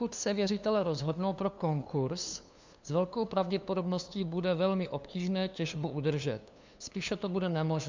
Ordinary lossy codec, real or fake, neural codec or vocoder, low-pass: MP3, 64 kbps; fake; codec, 16 kHz, 2 kbps, FunCodec, trained on LibriTTS, 25 frames a second; 7.2 kHz